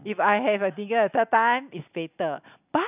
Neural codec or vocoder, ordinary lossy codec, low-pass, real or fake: none; none; 3.6 kHz; real